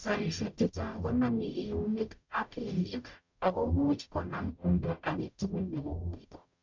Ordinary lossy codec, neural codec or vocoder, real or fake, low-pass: MP3, 64 kbps; codec, 44.1 kHz, 0.9 kbps, DAC; fake; 7.2 kHz